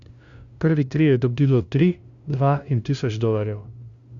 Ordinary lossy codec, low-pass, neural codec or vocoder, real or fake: Opus, 64 kbps; 7.2 kHz; codec, 16 kHz, 0.5 kbps, FunCodec, trained on LibriTTS, 25 frames a second; fake